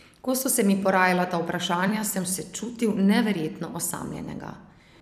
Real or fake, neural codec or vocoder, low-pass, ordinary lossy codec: fake; vocoder, 44.1 kHz, 128 mel bands every 256 samples, BigVGAN v2; 14.4 kHz; none